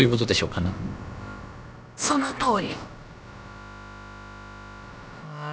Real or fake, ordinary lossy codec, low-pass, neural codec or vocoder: fake; none; none; codec, 16 kHz, about 1 kbps, DyCAST, with the encoder's durations